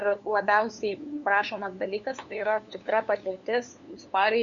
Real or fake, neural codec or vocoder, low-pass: fake; codec, 16 kHz, 2 kbps, FunCodec, trained on LibriTTS, 25 frames a second; 7.2 kHz